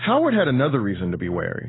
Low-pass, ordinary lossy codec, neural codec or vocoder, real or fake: 7.2 kHz; AAC, 16 kbps; none; real